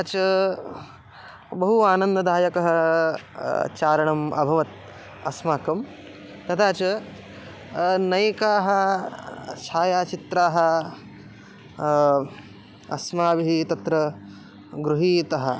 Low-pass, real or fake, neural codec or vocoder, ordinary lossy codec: none; real; none; none